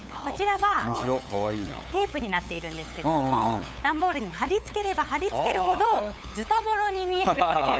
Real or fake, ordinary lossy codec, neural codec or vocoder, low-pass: fake; none; codec, 16 kHz, 8 kbps, FunCodec, trained on LibriTTS, 25 frames a second; none